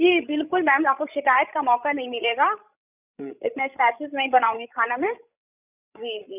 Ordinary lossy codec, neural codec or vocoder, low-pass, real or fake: none; codec, 16 kHz, 16 kbps, FreqCodec, larger model; 3.6 kHz; fake